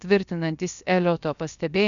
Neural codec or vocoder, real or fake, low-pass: codec, 16 kHz, about 1 kbps, DyCAST, with the encoder's durations; fake; 7.2 kHz